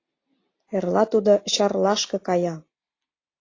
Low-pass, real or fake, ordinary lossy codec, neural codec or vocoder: 7.2 kHz; real; AAC, 32 kbps; none